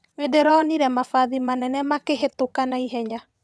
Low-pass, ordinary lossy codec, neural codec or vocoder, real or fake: none; none; vocoder, 22.05 kHz, 80 mel bands, WaveNeXt; fake